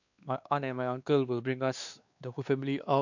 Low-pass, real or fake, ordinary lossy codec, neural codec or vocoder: 7.2 kHz; fake; none; codec, 16 kHz, 2 kbps, X-Codec, WavLM features, trained on Multilingual LibriSpeech